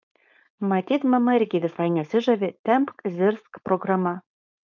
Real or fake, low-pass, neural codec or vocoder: fake; 7.2 kHz; codec, 16 kHz, 4.8 kbps, FACodec